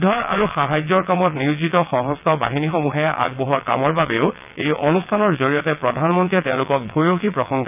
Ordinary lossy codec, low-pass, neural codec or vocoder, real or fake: none; 3.6 kHz; vocoder, 22.05 kHz, 80 mel bands, WaveNeXt; fake